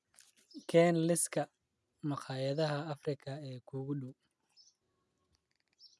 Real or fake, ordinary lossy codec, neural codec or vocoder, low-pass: real; none; none; none